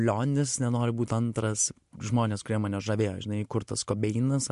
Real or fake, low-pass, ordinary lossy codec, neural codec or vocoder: real; 10.8 kHz; MP3, 64 kbps; none